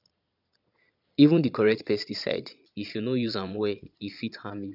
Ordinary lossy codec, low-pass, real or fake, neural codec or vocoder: none; 5.4 kHz; fake; vocoder, 22.05 kHz, 80 mel bands, Vocos